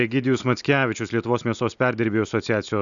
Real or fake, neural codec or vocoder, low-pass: real; none; 7.2 kHz